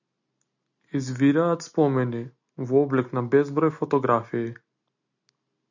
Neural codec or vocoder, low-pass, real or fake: none; 7.2 kHz; real